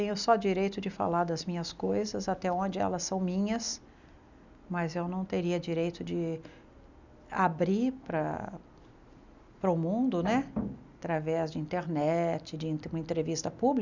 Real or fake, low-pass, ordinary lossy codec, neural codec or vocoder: real; 7.2 kHz; none; none